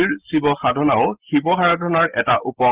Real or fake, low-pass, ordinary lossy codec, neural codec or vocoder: real; 3.6 kHz; Opus, 16 kbps; none